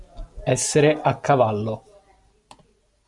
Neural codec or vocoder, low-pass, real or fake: none; 10.8 kHz; real